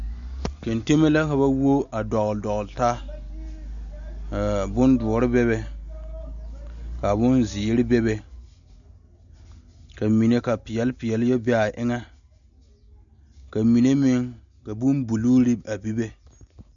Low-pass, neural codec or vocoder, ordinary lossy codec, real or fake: 7.2 kHz; none; MP3, 96 kbps; real